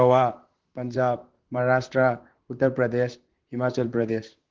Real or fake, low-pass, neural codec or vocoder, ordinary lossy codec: fake; 7.2 kHz; vocoder, 44.1 kHz, 128 mel bands, Pupu-Vocoder; Opus, 16 kbps